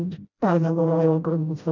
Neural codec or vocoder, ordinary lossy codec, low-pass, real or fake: codec, 16 kHz, 0.5 kbps, FreqCodec, smaller model; none; 7.2 kHz; fake